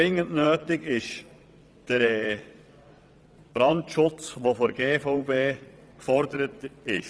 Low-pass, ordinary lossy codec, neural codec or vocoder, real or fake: none; none; vocoder, 22.05 kHz, 80 mel bands, WaveNeXt; fake